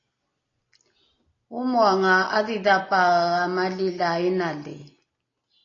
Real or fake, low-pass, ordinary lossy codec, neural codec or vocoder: real; 7.2 kHz; AAC, 32 kbps; none